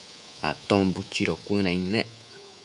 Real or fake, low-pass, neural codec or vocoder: fake; 10.8 kHz; codec, 24 kHz, 3.1 kbps, DualCodec